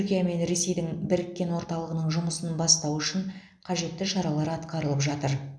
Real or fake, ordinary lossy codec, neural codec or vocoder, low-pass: real; none; none; none